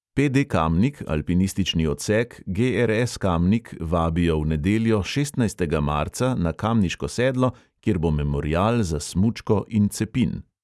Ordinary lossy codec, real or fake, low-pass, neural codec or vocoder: none; real; none; none